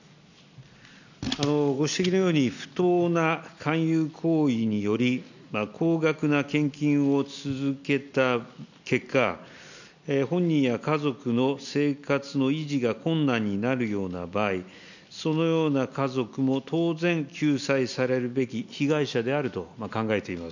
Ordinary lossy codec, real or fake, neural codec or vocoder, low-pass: none; real; none; 7.2 kHz